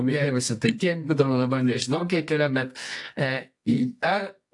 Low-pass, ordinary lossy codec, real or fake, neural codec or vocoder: 10.8 kHz; AAC, 64 kbps; fake; codec, 24 kHz, 0.9 kbps, WavTokenizer, medium music audio release